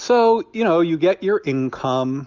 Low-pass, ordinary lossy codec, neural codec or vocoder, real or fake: 7.2 kHz; Opus, 32 kbps; none; real